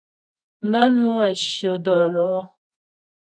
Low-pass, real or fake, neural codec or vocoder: 9.9 kHz; fake; codec, 24 kHz, 0.9 kbps, WavTokenizer, medium music audio release